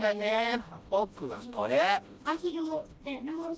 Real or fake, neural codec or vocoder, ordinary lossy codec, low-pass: fake; codec, 16 kHz, 1 kbps, FreqCodec, smaller model; none; none